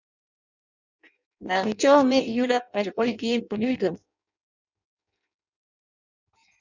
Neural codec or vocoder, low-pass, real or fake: codec, 16 kHz in and 24 kHz out, 0.6 kbps, FireRedTTS-2 codec; 7.2 kHz; fake